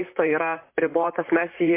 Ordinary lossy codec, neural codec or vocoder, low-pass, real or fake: MP3, 24 kbps; vocoder, 44.1 kHz, 128 mel bands, Pupu-Vocoder; 3.6 kHz; fake